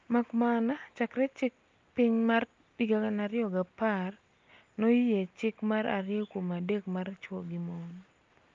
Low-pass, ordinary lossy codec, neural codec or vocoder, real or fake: 7.2 kHz; Opus, 24 kbps; none; real